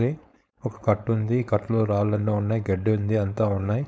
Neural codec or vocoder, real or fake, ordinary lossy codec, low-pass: codec, 16 kHz, 4.8 kbps, FACodec; fake; none; none